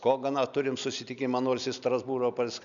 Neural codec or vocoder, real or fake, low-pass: none; real; 7.2 kHz